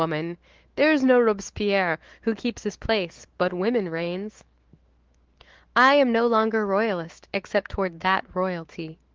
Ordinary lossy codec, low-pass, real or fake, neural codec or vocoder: Opus, 16 kbps; 7.2 kHz; fake; autoencoder, 48 kHz, 128 numbers a frame, DAC-VAE, trained on Japanese speech